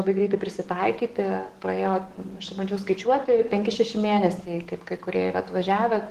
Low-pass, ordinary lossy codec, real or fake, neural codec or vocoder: 14.4 kHz; Opus, 16 kbps; fake; codec, 44.1 kHz, 7.8 kbps, DAC